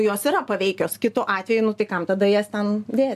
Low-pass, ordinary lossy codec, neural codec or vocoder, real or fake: 14.4 kHz; AAC, 96 kbps; none; real